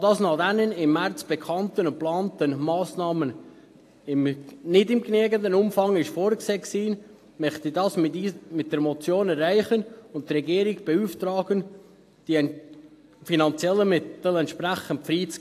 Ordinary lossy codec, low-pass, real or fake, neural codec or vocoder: AAC, 64 kbps; 14.4 kHz; real; none